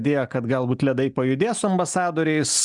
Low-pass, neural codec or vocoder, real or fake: 10.8 kHz; none; real